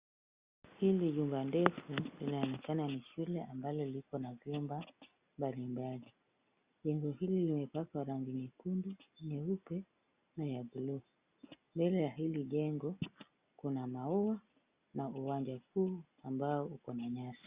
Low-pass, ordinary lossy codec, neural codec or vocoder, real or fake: 3.6 kHz; Opus, 64 kbps; none; real